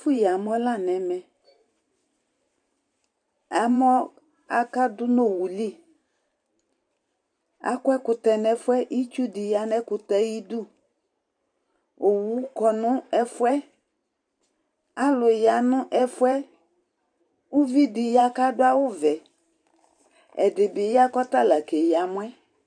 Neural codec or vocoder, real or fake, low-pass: vocoder, 44.1 kHz, 128 mel bands every 512 samples, BigVGAN v2; fake; 9.9 kHz